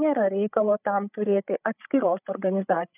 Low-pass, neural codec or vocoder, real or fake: 3.6 kHz; codec, 16 kHz, 8 kbps, FreqCodec, larger model; fake